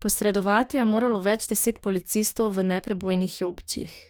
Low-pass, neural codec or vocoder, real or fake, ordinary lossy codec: none; codec, 44.1 kHz, 2.6 kbps, DAC; fake; none